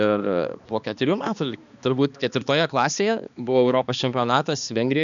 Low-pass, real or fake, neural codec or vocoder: 7.2 kHz; fake; codec, 16 kHz, 2 kbps, X-Codec, HuBERT features, trained on balanced general audio